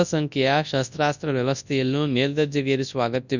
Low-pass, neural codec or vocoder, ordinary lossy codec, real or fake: 7.2 kHz; codec, 24 kHz, 0.9 kbps, WavTokenizer, large speech release; none; fake